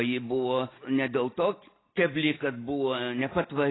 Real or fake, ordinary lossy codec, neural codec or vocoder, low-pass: real; AAC, 16 kbps; none; 7.2 kHz